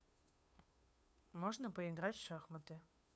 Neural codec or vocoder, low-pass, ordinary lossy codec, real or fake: codec, 16 kHz, 2 kbps, FunCodec, trained on Chinese and English, 25 frames a second; none; none; fake